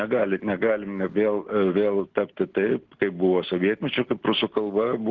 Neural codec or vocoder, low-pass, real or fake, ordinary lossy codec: none; 7.2 kHz; real; Opus, 16 kbps